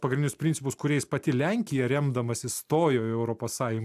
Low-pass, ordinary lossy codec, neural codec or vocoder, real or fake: 14.4 kHz; AAC, 96 kbps; none; real